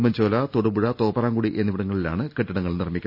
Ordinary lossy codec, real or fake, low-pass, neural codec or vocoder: none; real; 5.4 kHz; none